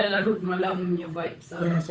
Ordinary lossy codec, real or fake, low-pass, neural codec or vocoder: none; fake; none; codec, 16 kHz, 8 kbps, FunCodec, trained on Chinese and English, 25 frames a second